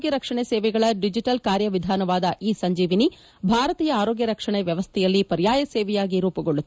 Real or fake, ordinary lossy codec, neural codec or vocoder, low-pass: real; none; none; none